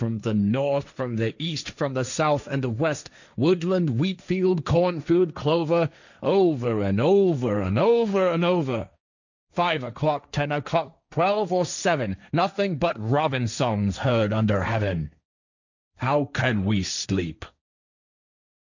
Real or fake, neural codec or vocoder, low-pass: fake; codec, 16 kHz, 1.1 kbps, Voila-Tokenizer; 7.2 kHz